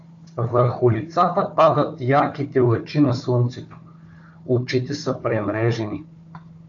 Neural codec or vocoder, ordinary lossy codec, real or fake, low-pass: codec, 16 kHz, 4 kbps, FunCodec, trained on Chinese and English, 50 frames a second; MP3, 64 kbps; fake; 7.2 kHz